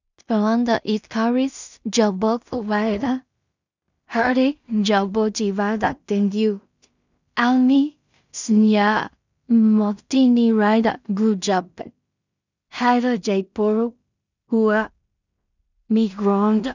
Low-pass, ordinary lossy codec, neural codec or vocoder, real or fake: 7.2 kHz; none; codec, 16 kHz in and 24 kHz out, 0.4 kbps, LongCat-Audio-Codec, two codebook decoder; fake